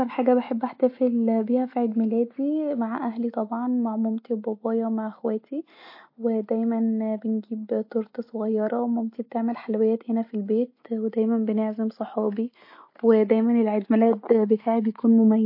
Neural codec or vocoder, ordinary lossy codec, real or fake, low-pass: none; MP3, 32 kbps; real; 5.4 kHz